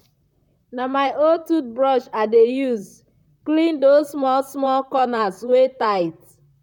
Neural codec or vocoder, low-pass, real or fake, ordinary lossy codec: vocoder, 44.1 kHz, 128 mel bands, Pupu-Vocoder; 19.8 kHz; fake; none